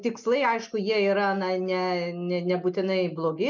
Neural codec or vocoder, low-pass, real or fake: none; 7.2 kHz; real